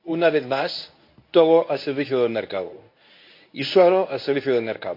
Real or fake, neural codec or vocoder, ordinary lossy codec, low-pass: fake; codec, 24 kHz, 0.9 kbps, WavTokenizer, medium speech release version 1; MP3, 32 kbps; 5.4 kHz